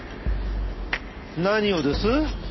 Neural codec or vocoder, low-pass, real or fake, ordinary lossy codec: none; 7.2 kHz; real; MP3, 24 kbps